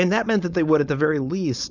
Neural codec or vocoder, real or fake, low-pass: codec, 16 kHz, 4.8 kbps, FACodec; fake; 7.2 kHz